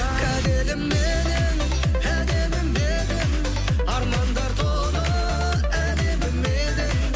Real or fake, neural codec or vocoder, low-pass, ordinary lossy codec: real; none; none; none